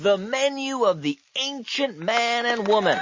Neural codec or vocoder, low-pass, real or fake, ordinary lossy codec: none; 7.2 kHz; real; MP3, 32 kbps